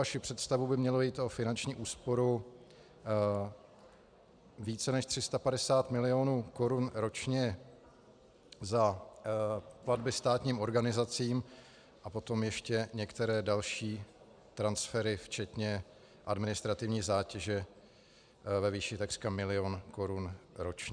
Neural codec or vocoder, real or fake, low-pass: none; real; 9.9 kHz